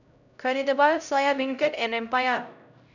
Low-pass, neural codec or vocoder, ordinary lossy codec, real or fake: 7.2 kHz; codec, 16 kHz, 0.5 kbps, X-Codec, HuBERT features, trained on LibriSpeech; none; fake